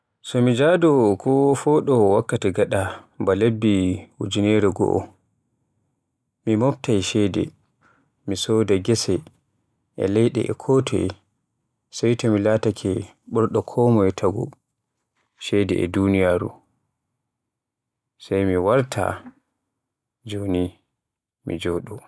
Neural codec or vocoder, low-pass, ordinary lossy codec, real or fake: none; none; none; real